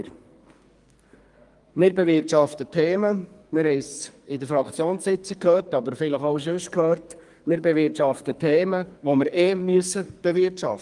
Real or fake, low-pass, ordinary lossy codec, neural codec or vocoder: fake; 10.8 kHz; Opus, 32 kbps; codec, 44.1 kHz, 2.6 kbps, SNAC